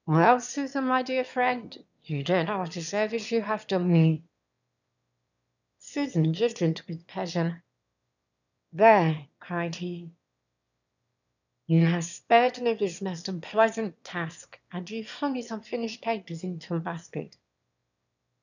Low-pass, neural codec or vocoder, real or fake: 7.2 kHz; autoencoder, 22.05 kHz, a latent of 192 numbers a frame, VITS, trained on one speaker; fake